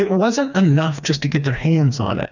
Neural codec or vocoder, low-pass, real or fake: codec, 16 kHz, 2 kbps, FreqCodec, smaller model; 7.2 kHz; fake